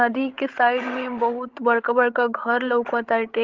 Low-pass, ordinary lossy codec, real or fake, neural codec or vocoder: 7.2 kHz; Opus, 16 kbps; fake; codec, 16 kHz, 16 kbps, FreqCodec, larger model